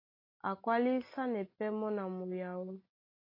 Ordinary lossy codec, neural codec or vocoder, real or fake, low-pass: AAC, 32 kbps; none; real; 5.4 kHz